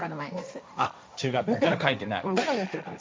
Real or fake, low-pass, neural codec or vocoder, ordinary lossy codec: fake; none; codec, 16 kHz, 1.1 kbps, Voila-Tokenizer; none